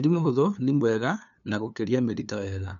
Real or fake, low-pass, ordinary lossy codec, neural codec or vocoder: fake; 7.2 kHz; none; codec, 16 kHz, 2 kbps, FunCodec, trained on LibriTTS, 25 frames a second